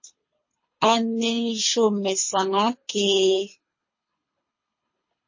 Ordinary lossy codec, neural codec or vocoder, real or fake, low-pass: MP3, 32 kbps; codec, 24 kHz, 3 kbps, HILCodec; fake; 7.2 kHz